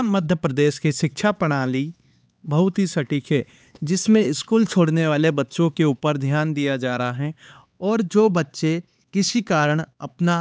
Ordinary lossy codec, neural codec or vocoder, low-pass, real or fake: none; codec, 16 kHz, 4 kbps, X-Codec, HuBERT features, trained on LibriSpeech; none; fake